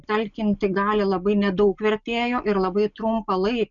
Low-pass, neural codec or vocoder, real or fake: 7.2 kHz; none; real